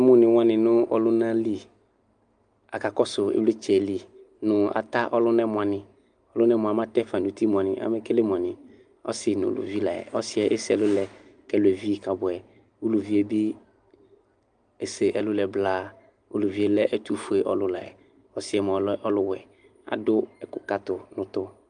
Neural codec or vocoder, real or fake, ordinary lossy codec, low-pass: none; real; Opus, 32 kbps; 9.9 kHz